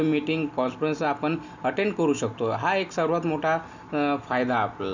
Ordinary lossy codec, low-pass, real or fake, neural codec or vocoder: Opus, 64 kbps; 7.2 kHz; real; none